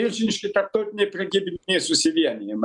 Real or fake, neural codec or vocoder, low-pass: real; none; 10.8 kHz